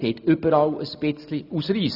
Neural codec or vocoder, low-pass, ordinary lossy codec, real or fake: none; 5.4 kHz; none; real